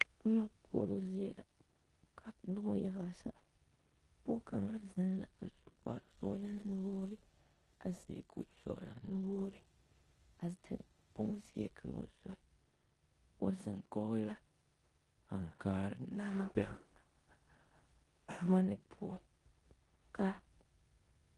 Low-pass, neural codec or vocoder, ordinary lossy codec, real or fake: 10.8 kHz; codec, 16 kHz in and 24 kHz out, 0.9 kbps, LongCat-Audio-Codec, four codebook decoder; Opus, 24 kbps; fake